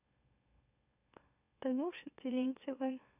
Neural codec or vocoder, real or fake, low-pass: autoencoder, 44.1 kHz, a latent of 192 numbers a frame, MeloTTS; fake; 3.6 kHz